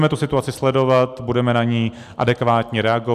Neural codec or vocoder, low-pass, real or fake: none; 14.4 kHz; real